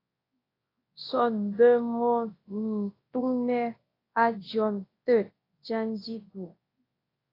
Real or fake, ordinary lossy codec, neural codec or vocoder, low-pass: fake; AAC, 24 kbps; codec, 24 kHz, 0.9 kbps, WavTokenizer, large speech release; 5.4 kHz